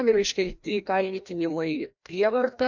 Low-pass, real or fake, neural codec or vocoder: 7.2 kHz; fake; codec, 16 kHz, 1 kbps, FreqCodec, larger model